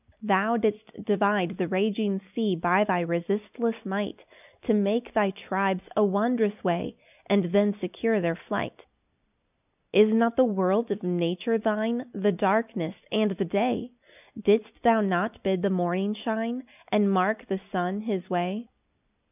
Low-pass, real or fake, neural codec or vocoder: 3.6 kHz; real; none